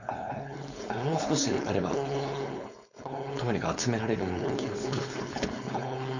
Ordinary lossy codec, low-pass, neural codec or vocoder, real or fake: none; 7.2 kHz; codec, 16 kHz, 4.8 kbps, FACodec; fake